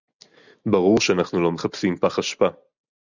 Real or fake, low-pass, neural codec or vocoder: real; 7.2 kHz; none